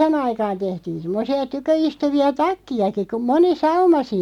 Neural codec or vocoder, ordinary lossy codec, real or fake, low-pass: none; MP3, 96 kbps; real; 19.8 kHz